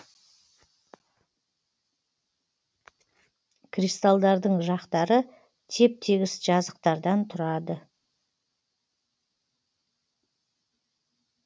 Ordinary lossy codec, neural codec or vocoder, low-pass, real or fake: none; none; none; real